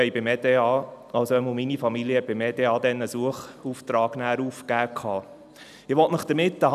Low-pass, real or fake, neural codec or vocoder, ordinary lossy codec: 14.4 kHz; real; none; none